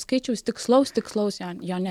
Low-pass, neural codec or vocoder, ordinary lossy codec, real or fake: 19.8 kHz; none; MP3, 96 kbps; real